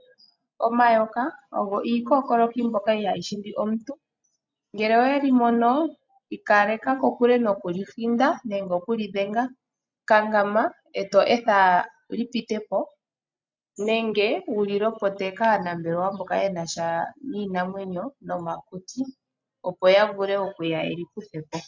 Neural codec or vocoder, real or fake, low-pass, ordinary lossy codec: none; real; 7.2 kHz; MP3, 64 kbps